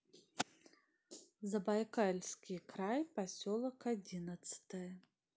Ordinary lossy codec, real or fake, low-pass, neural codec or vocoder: none; real; none; none